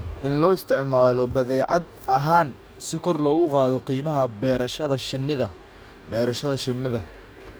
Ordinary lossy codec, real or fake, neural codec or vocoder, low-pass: none; fake; codec, 44.1 kHz, 2.6 kbps, DAC; none